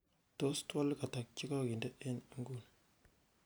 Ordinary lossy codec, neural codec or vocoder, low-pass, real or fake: none; none; none; real